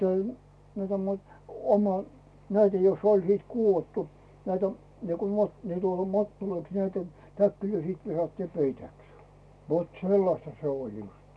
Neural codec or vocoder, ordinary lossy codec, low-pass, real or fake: none; none; none; real